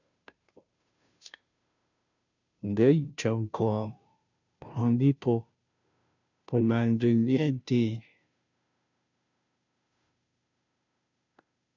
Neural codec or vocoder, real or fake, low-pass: codec, 16 kHz, 0.5 kbps, FunCodec, trained on Chinese and English, 25 frames a second; fake; 7.2 kHz